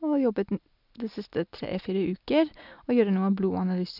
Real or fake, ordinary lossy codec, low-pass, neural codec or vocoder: real; none; 5.4 kHz; none